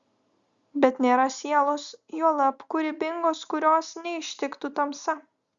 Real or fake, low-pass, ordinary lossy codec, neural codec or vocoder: real; 7.2 kHz; Opus, 64 kbps; none